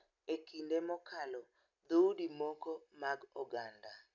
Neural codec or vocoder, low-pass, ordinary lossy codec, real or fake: none; 7.2 kHz; none; real